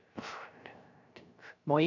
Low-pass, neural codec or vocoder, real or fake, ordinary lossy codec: 7.2 kHz; codec, 16 kHz, 0.3 kbps, FocalCodec; fake; none